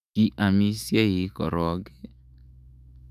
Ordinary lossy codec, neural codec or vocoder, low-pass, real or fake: none; autoencoder, 48 kHz, 128 numbers a frame, DAC-VAE, trained on Japanese speech; 14.4 kHz; fake